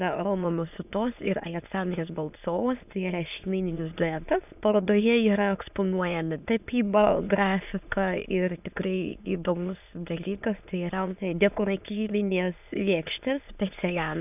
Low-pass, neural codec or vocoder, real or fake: 3.6 kHz; autoencoder, 22.05 kHz, a latent of 192 numbers a frame, VITS, trained on many speakers; fake